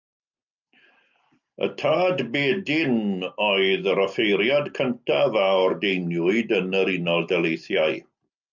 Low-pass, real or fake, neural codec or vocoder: 7.2 kHz; real; none